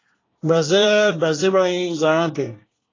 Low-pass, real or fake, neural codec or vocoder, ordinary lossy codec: 7.2 kHz; fake; codec, 24 kHz, 1 kbps, SNAC; AAC, 32 kbps